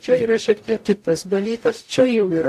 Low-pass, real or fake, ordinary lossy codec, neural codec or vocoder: 14.4 kHz; fake; AAC, 64 kbps; codec, 44.1 kHz, 0.9 kbps, DAC